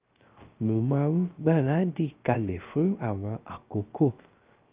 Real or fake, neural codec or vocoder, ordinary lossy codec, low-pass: fake; codec, 16 kHz, 0.3 kbps, FocalCodec; Opus, 24 kbps; 3.6 kHz